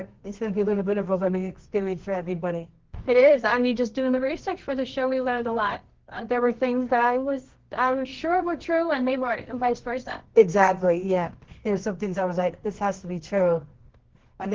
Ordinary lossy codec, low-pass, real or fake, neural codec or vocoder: Opus, 16 kbps; 7.2 kHz; fake; codec, 24 kHz, 0.9 kbps, WavTokenizer, medium music audio release